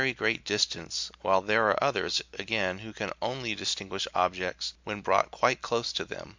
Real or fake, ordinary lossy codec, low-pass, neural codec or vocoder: real; MP3, 64 kbps; 7.2 kHz; none